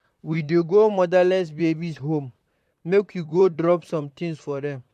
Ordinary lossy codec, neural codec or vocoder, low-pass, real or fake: MP3, 64 kbps; vocoder, 44.1 kHz, 128 mel bands, Pupu-Vocoder; 14.4 kHz; fake